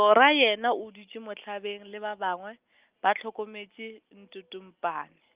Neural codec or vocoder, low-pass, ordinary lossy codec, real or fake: none; 3.6 kHz; Opus, 32 kbps; real